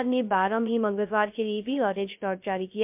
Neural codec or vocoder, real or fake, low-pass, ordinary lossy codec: codec, 16 kHz, 0.2 kbps, FocalCodec; fake; 3.6 kHz; MP3, 32 kbps